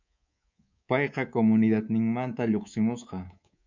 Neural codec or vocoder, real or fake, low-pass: codec, 24 kHz, 3.1 kbps, DualCodec; fake; 7.2 kHz